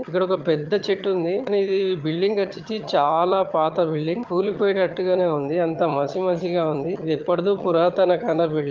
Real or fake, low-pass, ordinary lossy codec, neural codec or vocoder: fake; 7.2 kHz; Opus, 24 kbps; vocoder, 22.05 kHz, 80 mel bands, HiFi-GAN